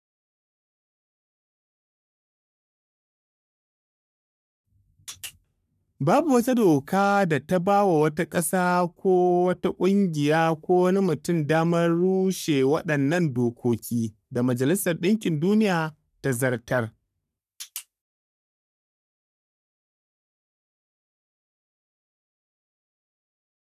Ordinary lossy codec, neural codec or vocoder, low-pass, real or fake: none; codec, 44.1 kHz, 3.4 kbps, Pupu-Codec; 14.4 kHz; fake